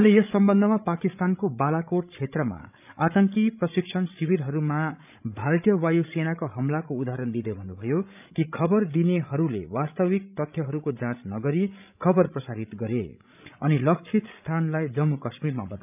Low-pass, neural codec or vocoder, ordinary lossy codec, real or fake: 3.6 kHz; codec, 16 kHz, 16 kbps, FreqCodec, larger model; none; fake